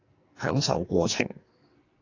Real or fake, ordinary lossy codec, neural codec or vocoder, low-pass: fake; AAC, 32 kbps; codec, 44.1 kHz, 2.6 kbps, SNAC; 7.2 kHz